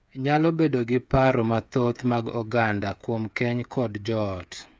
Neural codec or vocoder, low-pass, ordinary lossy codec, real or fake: codec, 16 kHz, 8 kbps, FreqCodec, smaller model; none; none; fake